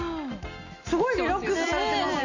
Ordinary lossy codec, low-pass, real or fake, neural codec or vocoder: none; 7.2 kHz; real; none